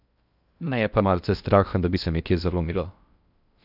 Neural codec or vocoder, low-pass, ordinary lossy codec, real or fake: codec, 16 kHz in and 24 kHz out, 0.6 kbps, FocalCodec, streaming, 2048 codes; 5.4 kHz; none; fake